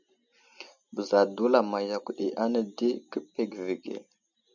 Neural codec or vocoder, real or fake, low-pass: none; real; 7.2 kHz